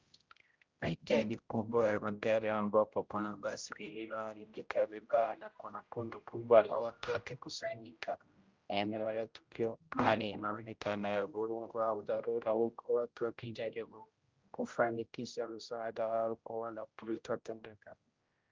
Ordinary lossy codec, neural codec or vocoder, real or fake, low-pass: Opus, 32 kbps; codec, 16 kHz, 0.5 kbps, X-Codec, HuBERT features, trained on general audio; fake; 7.2 kHz